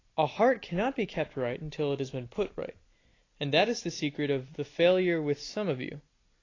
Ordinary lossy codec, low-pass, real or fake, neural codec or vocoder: AAC, 32 kbps; 7.2 kHz; real; none